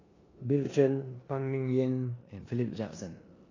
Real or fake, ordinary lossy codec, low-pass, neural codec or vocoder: fake; AAC, 32 kbps; 7.2 kHz; codec, 16 kHz in and 24 kHz out, 0.9 kbps, LongCat-Audio-Codec, four codebook decoder